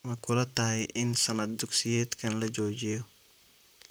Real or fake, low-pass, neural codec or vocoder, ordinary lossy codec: fake; none; vocoder, 44.1 kHz, 128 mel bands, Pupu-Vocoder; none